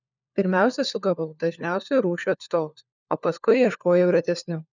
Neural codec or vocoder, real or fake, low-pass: codec, 16 kHz, 4 kbps, FunCodec, trained on LibriTTS, 50 frames a second; fake; 7.2 kHz